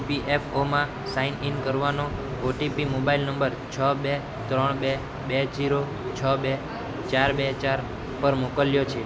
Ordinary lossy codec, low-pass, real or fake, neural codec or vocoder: none; none; real; none